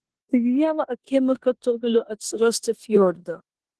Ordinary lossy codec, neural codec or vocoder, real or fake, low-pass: Opus, 16 kbps; codec, 16 kHz in and 24 kHz out, 0.9 kbps, LongCat-Audio-Codec, four codebook decoder; fake; 10.8 kHz